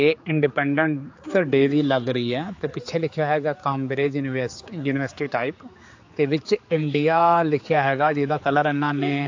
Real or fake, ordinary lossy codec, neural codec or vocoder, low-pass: fake; AAC, 48 kbps; codec, 16 kHz, 4 kbps, X-Codec, HuBERT features, trained on general audio; 7.2 kHz